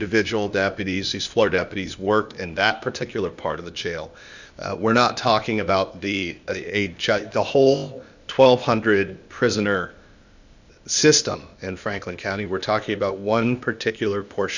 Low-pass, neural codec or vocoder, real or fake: 7.2 kHz; codec, 16 kHz, 0.8 kbps, ZipCodec; fake